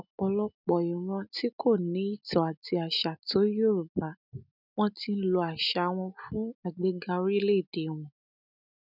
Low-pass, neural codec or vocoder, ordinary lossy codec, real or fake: 5.4 kHz; none; none; real